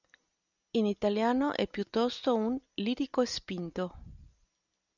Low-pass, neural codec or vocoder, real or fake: 7.2 kHz; none; real